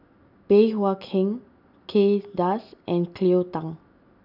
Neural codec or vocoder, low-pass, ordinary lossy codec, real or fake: none; 5.4 kHz; none; real